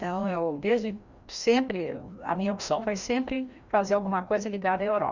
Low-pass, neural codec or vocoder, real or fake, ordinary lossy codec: 7.2 kHz; codec, 16 kHz, 1 kbps, FreqCodec, larger model; fake; Opus, 64 kbps